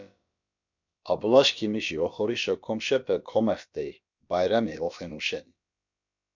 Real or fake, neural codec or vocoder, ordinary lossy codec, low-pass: fake; codec, 16 kHz, about 1 kbps, DyCAST, with the encoder's durations; MP3, 64 kbps; 7.2 kHz